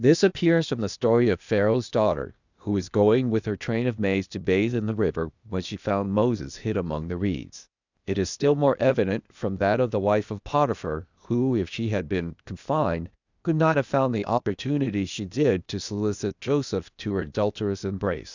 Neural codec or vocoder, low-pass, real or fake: codec, 16 kHz, 0.8 kbps, ZipCodec; 7.2 kHz; fake